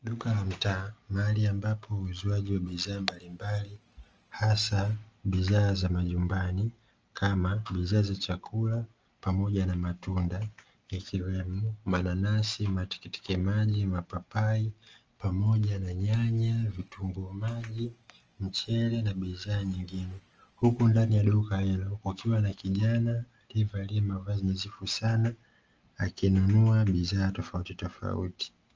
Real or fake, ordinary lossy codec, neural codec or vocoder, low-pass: real; Opus, 24 kbps; none; 7.2 kHz